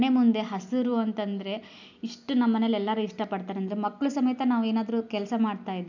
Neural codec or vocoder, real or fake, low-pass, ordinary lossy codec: none; real; 7.2 kHz; none